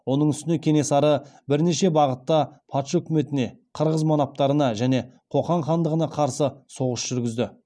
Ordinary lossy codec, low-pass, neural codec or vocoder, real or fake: none; none; none; real